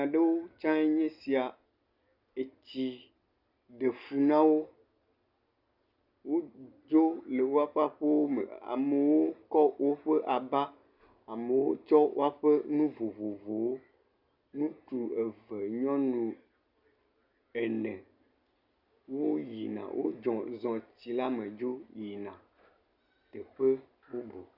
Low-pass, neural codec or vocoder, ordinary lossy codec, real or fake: 5.4 kHz; none; Opus, 64 kbps; real